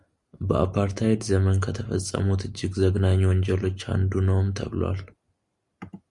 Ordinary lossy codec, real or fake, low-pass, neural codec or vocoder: Opus, 64 kbps; real; 10.8 kHz; none